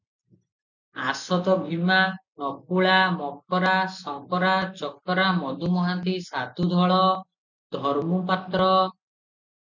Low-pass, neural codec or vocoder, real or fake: 7.2 kHz; none; real